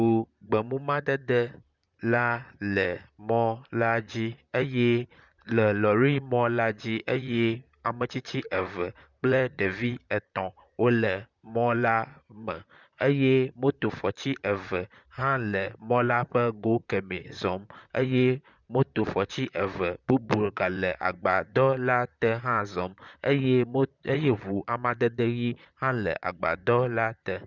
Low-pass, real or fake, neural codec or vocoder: 7.2 kHz; fake; vocoder, 44.1 kHz, 128 mel bands, Pupu-Vocoder